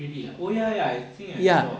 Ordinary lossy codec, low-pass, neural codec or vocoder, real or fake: none; none; none; real